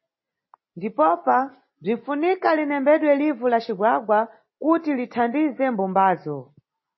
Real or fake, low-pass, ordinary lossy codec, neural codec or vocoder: real; 7.2 kHz; MP3, 24 kbps; none